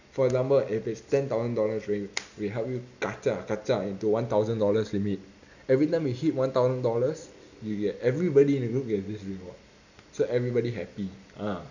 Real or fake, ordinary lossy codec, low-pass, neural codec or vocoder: real; none; 7.2 kHz; none